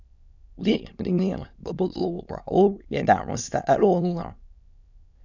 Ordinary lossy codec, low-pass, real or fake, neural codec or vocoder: none; 7.2 kHz; fake; autoencoder, 22.05 kHz, a latent of 192 numbers a frame, VITS, trained on many speakers